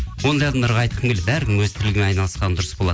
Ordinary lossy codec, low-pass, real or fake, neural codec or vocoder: none; none; real; none